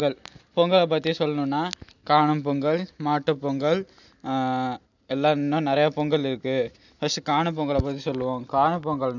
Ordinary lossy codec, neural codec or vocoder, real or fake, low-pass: none; none; real; 7.2 kHz